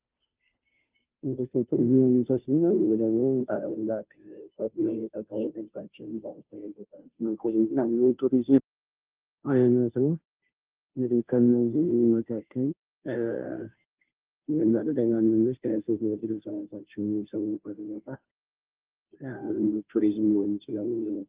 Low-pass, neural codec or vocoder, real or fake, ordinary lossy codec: 3.6 kHz; codec, 16 kHz, 0.5 kbps, FunCodec, trained on Chinese and English, 25 frames a second; fake; Opus, 32 kbps